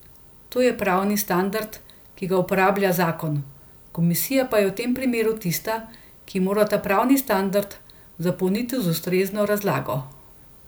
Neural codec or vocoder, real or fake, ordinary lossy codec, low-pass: none; real; none; none